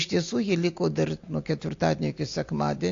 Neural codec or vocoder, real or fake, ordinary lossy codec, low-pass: none; real; AAC, 48 kbps; 7.2 kHz